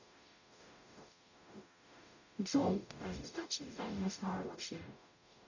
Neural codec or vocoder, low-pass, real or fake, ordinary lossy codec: codec, 44.1 kHz, 0.9 kbps, DAC; 7.2 kHz; fake; none